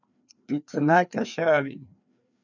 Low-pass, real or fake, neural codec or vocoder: 7.2 kHz; fake; codec, 16 kHz, 2 kbps, FreqCodec, larger model